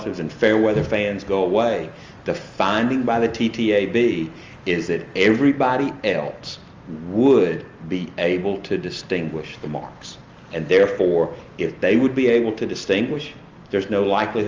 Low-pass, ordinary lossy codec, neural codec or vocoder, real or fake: 7.2 kHz; Opus, 32 kbps; none; real